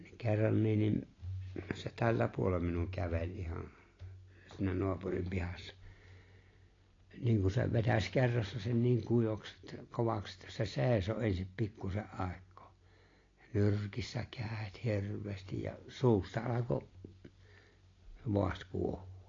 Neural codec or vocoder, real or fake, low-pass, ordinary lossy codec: none; real; 7.2 kHz; AAC, 32 kbps